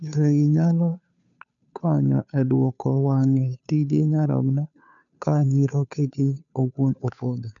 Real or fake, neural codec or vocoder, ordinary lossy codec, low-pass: fake; codec, 16 kHz, 2 kbps, FunCodec, trained on Chinese and English, 25 frames a second; none; 7.2 kHz